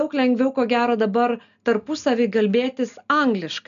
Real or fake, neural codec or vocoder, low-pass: real; none; 7.2 kHz